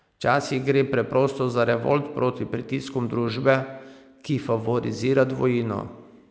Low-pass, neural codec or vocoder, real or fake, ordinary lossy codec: none; none; real; none